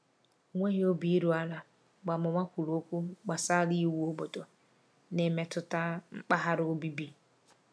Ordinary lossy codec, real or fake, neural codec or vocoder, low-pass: none; real; none; none